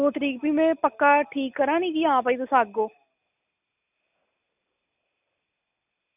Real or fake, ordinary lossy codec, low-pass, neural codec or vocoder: real; none; 3.6 kHz; none